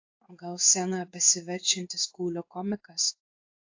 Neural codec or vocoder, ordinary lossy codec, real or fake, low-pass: codec, 16 kHz in and 24 kHz out, 1 kbps, XY-Tokenizer; AAC, 48 kbps; fake; 7.2 kHz